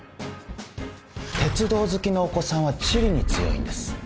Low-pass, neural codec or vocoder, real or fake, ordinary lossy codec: none; none; real; none